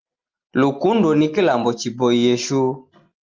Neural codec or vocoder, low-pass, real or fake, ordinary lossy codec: none; 7.2 kHz; real; Opus, 32 kbps